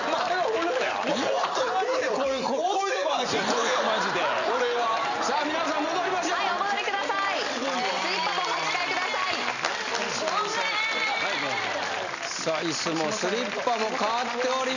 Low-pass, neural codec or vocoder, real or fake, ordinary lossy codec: 7.2 kHz; none; real; none